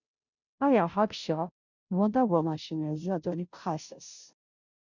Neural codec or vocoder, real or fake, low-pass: codec, 16 kHz, 0.5 kbps, FunCodec, trained on Chinese and English, 25 frames a second; fake; 7.2 kHz